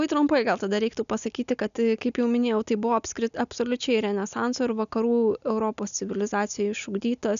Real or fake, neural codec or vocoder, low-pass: real; none; 7.2 kHz